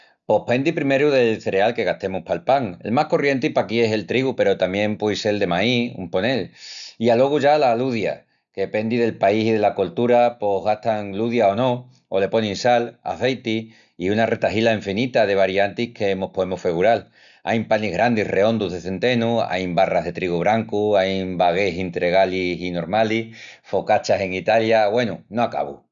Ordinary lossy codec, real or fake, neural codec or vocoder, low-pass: none; real; none; 7.2 kHz